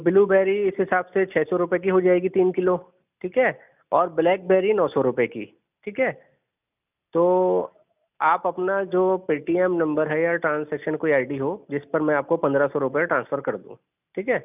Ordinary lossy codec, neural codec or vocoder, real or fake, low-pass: none; none; real; 3.6 kHz